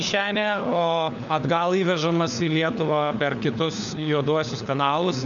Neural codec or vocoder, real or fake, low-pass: codec, 16 kHz, 4 kbps, FunCodec, trained on LibriTTS, 50 frames a second; fake; 7.2 kHz